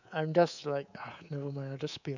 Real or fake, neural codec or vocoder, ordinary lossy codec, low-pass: fake; codec, 24 kHz, 3.1 kbps, DualCodec; none; 7.2 kHz